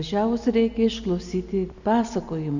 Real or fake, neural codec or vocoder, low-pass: real; none; 7.2 kHz